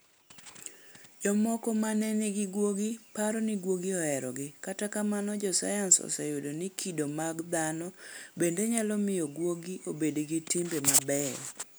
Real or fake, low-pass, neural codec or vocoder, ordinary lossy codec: real; none; none; none